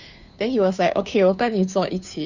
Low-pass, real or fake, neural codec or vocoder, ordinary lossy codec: 7.2 kHz; fake; codec, 16 kHz, 2 kbps, FunCodec, trained on Chinese and English, 25 frames a second; none